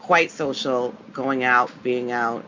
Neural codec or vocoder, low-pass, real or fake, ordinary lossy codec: none; 7.2 kHz; real; MP3, 48 kbps